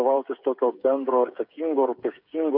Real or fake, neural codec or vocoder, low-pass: fake; codec, 16 kHz, 8 kbps, FreqCodec, smaller model; 5.4 kHz